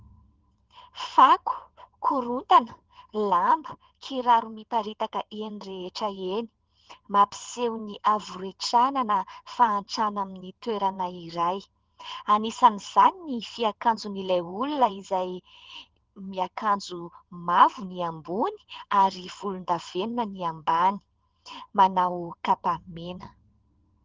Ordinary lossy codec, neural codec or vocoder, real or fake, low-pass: Opus, 16 kbps; vocoder, 22.05 kHz, 80 mel bands, WaveNeXt; fake; 7.2 kHz